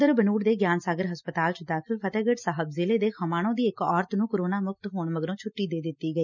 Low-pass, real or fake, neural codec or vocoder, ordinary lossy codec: 7.2 kHz; real; none; none